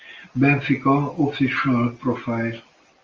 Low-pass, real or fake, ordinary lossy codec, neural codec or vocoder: 7.2 kHz; real; Opus, 32 kbps; none